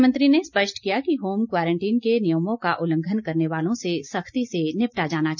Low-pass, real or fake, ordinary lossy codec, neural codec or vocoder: 7.2 kHz; real; none; none